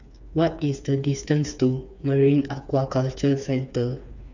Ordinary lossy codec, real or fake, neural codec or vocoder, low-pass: none; fake; codec, 16 kHz, 4 kbps, FreqCodec, smaller model; 7.2 kHz